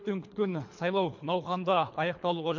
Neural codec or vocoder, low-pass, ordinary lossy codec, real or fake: codec, 24 kHz, 3 kbps, HILCodec; 7.2 kHz; MP3, 48 kbps; fake